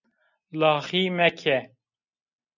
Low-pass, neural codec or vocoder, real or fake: 7.2 kHz; none; real